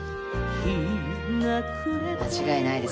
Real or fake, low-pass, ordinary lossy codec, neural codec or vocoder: real; none; none; none